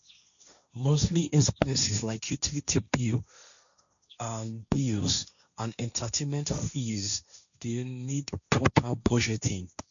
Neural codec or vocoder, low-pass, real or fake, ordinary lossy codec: codec, 16 kHz, 1.1 kbps, Voila-Tokenizer; 7.2 kHz; fake; none